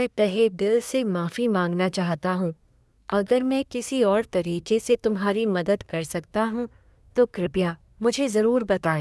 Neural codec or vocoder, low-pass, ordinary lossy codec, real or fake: codec, 24 kHz, 1 kbps, SNAC; none; none; fake